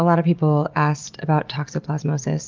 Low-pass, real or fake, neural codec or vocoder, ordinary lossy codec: 7.2 kHz; real; none; Opus, 32 kbps